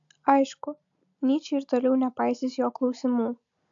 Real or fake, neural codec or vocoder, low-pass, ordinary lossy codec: real; none; 7.2 kHz; AAC, 64 kbps